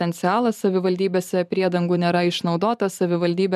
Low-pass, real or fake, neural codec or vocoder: 14.4 kHz; fake; vocoder, 44.1 kHz, 128 mel bands every 512 samples, BigVGAN v2